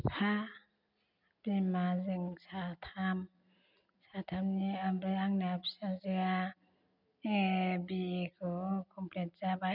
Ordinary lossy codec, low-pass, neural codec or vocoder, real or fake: none; 5.4 kHz; none; real